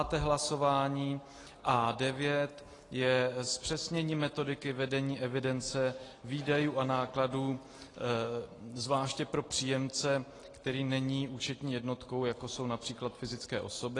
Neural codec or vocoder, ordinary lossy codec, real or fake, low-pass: none; AAC, 32 kbps; real; 10.8 kHz